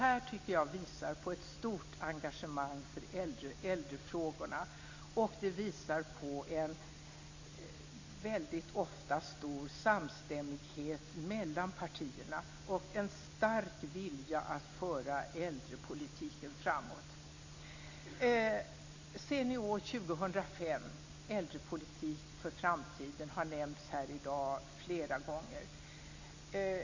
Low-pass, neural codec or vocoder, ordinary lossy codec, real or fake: 7.2 kHz; none; AAC, 48 kbps; real